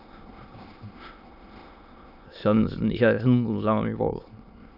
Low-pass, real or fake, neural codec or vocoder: 5.4 kHz; fake; autoencoder, 22.05 kHz, a latent of 192 numbers a frame, VITS, trained on many speakers